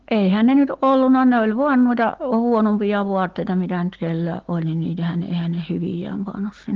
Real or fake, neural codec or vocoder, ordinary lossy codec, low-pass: real; none; Opus, 16 kbps; 7.2 kHz